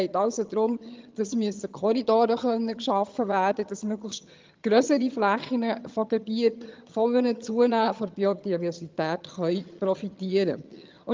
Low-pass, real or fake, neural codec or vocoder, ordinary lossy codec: 7.2 kHz; fake; vocoder, 22.05 kHz, 80 mel bands, HiFi-GAN; Opus, 24 kbps